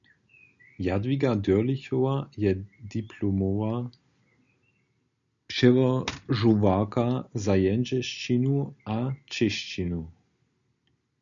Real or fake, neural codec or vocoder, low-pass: real; none; 7.2 kHz